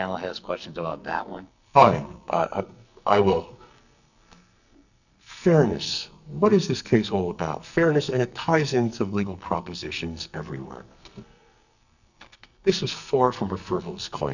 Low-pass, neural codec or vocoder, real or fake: 7.2 kHz; codec, 44.1 kHz, 2.6 kbps, SNAC; fake